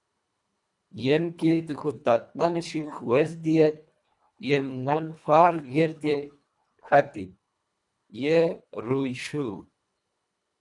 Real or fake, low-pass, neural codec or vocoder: fake; 10.8 kHz; codec, 24 kHz, 1.5 kbps, HILCodec